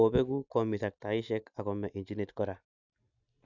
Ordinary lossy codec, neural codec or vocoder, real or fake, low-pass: none; none; real; 7.2 kHz